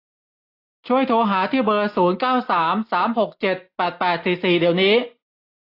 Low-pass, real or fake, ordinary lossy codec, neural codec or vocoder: 5.4 kHz; real; AAC, 32 kbps; none